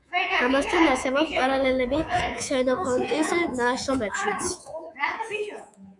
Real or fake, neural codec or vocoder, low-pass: fake; codec, 24 kHz, 3.1 kbps, DualCodec; 10.8 kHz